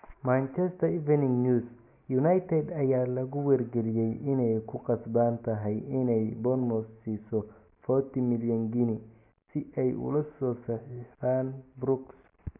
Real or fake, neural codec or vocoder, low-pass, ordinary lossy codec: real; none; 3.6 kHz; none